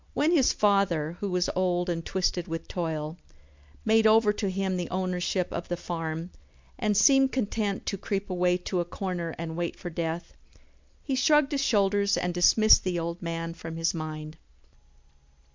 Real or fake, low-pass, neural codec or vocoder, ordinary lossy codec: real; 7.2 kHz; none; MP3, 64 kbps